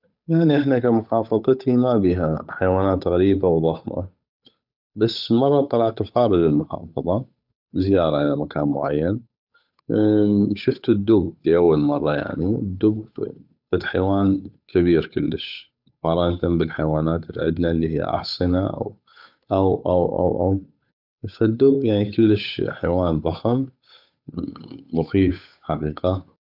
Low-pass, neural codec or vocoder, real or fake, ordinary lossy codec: 5.4 kHz; codec, 16 kHz, 8 kbps, FunCodec, trained on Chinese and English, 25 frames a second; fake; none